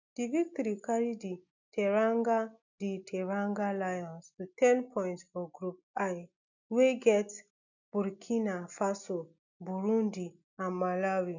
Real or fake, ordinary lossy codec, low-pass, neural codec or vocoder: real; none; 7.2 kHz; none